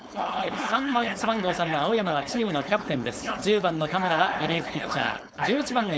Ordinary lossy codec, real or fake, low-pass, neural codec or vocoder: none; fake; none; codec, 16 kHz, 4.8 kbps, FACodec